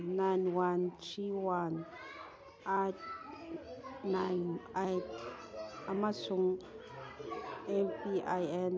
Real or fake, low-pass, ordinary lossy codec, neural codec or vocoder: real; none; none; none